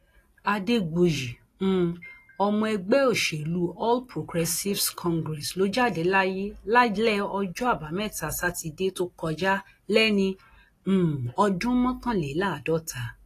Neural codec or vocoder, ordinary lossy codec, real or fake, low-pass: none; AAC, 48 kbps; real; 14.4 kHz